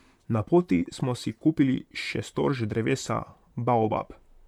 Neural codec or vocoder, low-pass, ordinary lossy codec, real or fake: vocoder, 44.1 kHz, 128 mel bands, Pupu-Vocoder; 19.8 kHz; none; fake